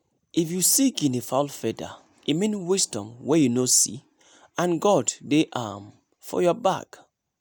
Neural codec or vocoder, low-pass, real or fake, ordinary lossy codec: none; none; real; none